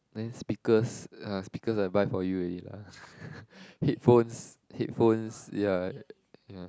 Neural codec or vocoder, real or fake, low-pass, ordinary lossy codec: none; real; none; none